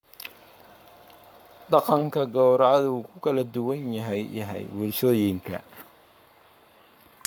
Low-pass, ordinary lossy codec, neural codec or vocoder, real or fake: none; none; codec, 44.1 kHz, 7.8 kbps, Pupu-Codec; fake